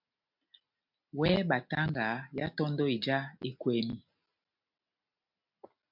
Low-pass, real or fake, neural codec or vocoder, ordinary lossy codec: 5.4 kHz; real; none; MP3, 48 kbps